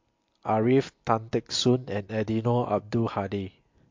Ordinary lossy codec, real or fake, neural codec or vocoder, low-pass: MP3, 48 kbps; real; none; 7.2 kHz